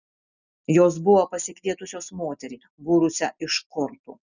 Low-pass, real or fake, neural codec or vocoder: 7.2 kHz; real; none